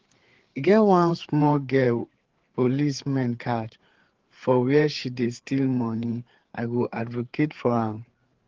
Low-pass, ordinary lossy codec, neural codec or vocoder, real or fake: 7.2 kHz; Opus, 16 kbps; codec, 16 kHz, 4 kbps, FreqCodec, larger model; fake